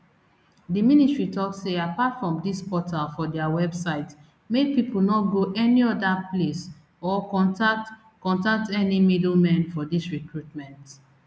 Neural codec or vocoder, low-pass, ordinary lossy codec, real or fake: none; none; none; real